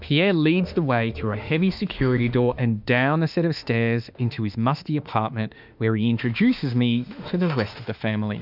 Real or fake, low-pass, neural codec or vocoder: fake; 5.4 kHz; autoencoder, 48 kHz, 32 numbers a frame, DAC-VAE, trained on Japanese speech